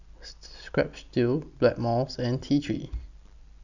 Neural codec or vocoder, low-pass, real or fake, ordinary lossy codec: none; 7.2 kHz; real; none